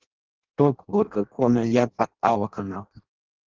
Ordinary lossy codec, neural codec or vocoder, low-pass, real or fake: Opus, 16 kbps; codec, 16 kHz in and 24 kHz out, 0.6 kbps, FireRedTTS-2 codec; 7.2 kHz; fake